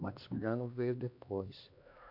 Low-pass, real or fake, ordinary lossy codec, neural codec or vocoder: 5.4 kHz; fake; AAC, 48 kbps; codec, 16 kHz, 2 kbps, X-Codec, HuBERT features, trained on LibriSpeech